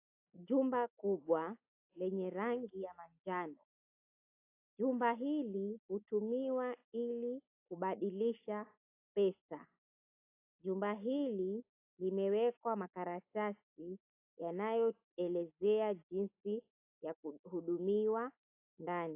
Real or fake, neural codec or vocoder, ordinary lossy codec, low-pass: real; none; AAC, 24 kbps; 3.6 kHz